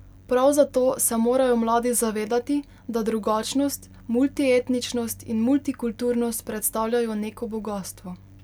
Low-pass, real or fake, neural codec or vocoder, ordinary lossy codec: 19.8 kHz; real; none; none